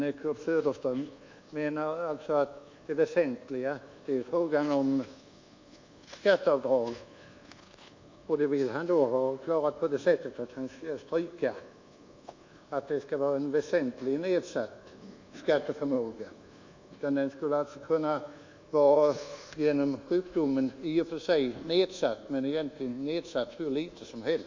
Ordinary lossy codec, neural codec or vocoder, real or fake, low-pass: MP3, 64 kbps; codec, 24 kHz, 1.2 kbps, DualCodec; fake; 7.2 kHz